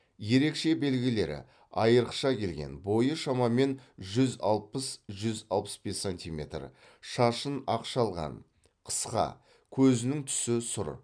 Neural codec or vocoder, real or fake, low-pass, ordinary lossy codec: none; real; 9.9 kHz; none